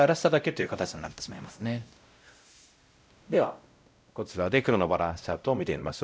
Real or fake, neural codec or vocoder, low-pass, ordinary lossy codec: fake; codec, 16 kHz, 0.5 kbps, X-Codec, WavLM features, trained on Multilingual LibriSpeech; none; none